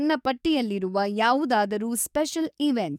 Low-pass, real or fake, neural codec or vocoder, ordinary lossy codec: 19.8 kHz; fake; codec, 44.1 kHz, 7.8 kbps, DAC; none